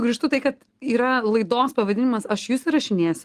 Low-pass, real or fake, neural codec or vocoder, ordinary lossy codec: 14.4 kHz; real; none; Opus, 24 kbps